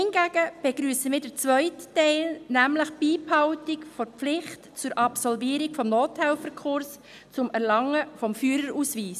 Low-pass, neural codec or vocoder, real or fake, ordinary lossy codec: 14.4 kHz; none; real; none